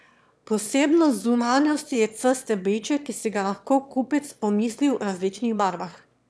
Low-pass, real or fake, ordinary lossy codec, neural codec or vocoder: none; fake; none; autoencoder, 22.05 kHz, a latent of 192 numbers a frame, VITS, trained on one speaker